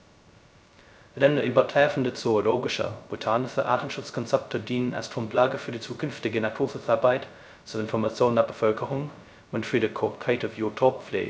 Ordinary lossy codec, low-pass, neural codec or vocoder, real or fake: none; none; codec, 16 kHz, 0.2 kbps, FocalCodec; fake